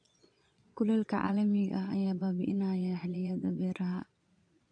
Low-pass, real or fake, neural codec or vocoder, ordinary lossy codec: 9.9 kHz; fake; vocoder, 22.05 kHz, 80 mel bands, Vocos; AAC, 64 kbps